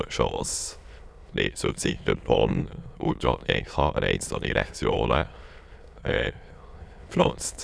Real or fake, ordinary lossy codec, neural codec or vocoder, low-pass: fake; none; autoencoder, 22.05 kHz, a latent of 192 numbers a frame, VITS, trained on many speakers; none